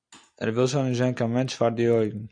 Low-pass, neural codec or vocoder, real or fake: 9.9 kHz; none; real